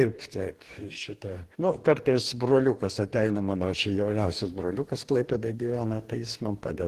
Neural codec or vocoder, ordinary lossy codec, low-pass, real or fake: codec, 44.1 kHz, 2.6 kbps, DAC; Opus, 16 kbps; 14.4 kHz; fake